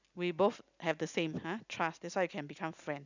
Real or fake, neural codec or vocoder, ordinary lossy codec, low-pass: real; none; none; 7.2 kHz